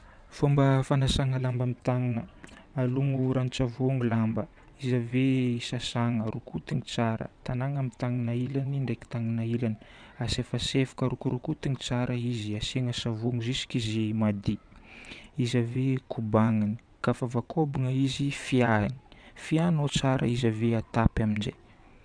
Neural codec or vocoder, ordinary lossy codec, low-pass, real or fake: vocoder, 22.05 kHz, 80 mel bands, WaveNeXt; none; 9.9 kHz; fake